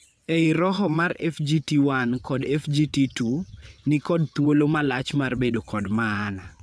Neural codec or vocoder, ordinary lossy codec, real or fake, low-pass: vocoder, 22.05 kHz, 80 mel bands, WaveNeXt; none; fake; none